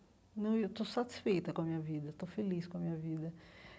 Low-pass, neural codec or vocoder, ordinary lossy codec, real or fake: none; none; none; real